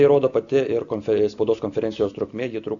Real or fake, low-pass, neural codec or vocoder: real; 7.2 kHz; none